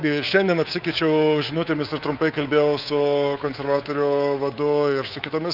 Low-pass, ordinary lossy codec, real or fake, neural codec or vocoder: 5.4 kHz; Opus, 24 kbps; fake; codec, 24 kHz, 3.1 kbps, DualCodec